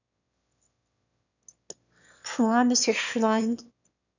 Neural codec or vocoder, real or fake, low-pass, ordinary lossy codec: autoencoder, 22.05 kHz, a latent of 192 numbers a frame, VITS, trained on one speaker; fake; 7.2 kHz; none